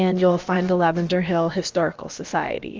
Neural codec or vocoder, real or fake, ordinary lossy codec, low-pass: codec, 16 kHz, about 1 kbps, DyCAST, with the encoder's durations; fake; Opus, 32 kbps; 7.2 kHz